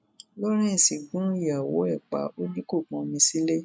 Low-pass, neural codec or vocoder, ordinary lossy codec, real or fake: none; none; none; real